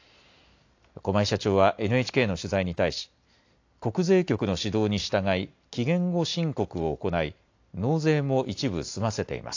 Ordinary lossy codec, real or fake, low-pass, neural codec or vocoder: AAC, 48 kbps; real; 7.2 kHz; none